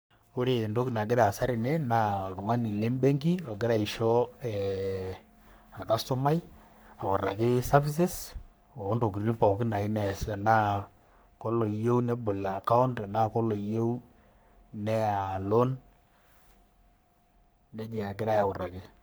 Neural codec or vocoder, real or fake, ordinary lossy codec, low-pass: codec, 44.1 kHz, 3.4 kbps, Pupu-Codec; fake; none; none